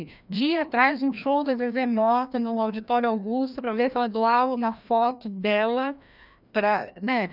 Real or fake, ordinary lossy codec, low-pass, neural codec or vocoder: fake; none; 5.4 kHz; codec, 16 kHz, 1 kbps, FreqCodec, larger model